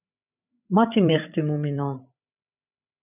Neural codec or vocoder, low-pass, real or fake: codec, 16 kHz, 16 kbps, FreqCodec, larger model; 3.6 kHz; fake